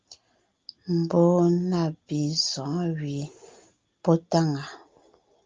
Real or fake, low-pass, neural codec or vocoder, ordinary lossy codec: real; 7.2 kHz; none; Opus, 32 kbps